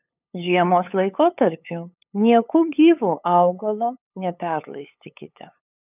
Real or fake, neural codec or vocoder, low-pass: fake; codec, 16 kHz, 8 kbps, FunCodec, trained on LibriTTS, 25 frames a second; 3.6 kHz